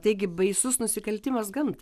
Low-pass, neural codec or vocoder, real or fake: 14.4 kHz; none; real